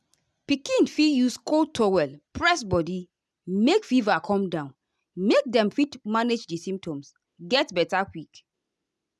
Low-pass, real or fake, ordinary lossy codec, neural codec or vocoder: none; real; none; none